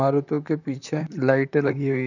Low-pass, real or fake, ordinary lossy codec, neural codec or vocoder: 7.2 kHz; fake; none; vocoder, 44.1 kHz, 128 mel bands, Pupu-Vocoder